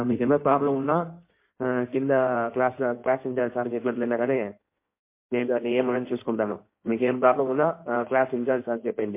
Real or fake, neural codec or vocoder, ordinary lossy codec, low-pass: fake; codec, 16 kHz in and 24 kHz out, 1.1 kbps, FireRedTTS-2 codec; MP3, 24 kbps; 3.6 kHz